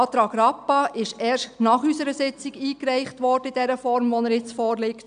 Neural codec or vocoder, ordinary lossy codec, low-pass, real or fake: none; none; 9.9 kHz; real